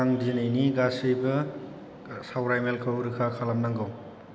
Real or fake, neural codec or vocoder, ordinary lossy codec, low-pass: real; none; none; none